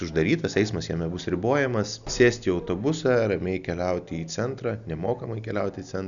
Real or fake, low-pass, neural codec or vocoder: real; 7.2 kHz; none